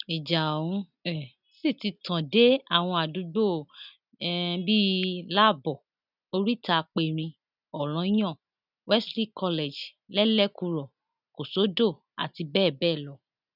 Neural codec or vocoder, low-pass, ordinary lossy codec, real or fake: none; 5.4 kHz; none; real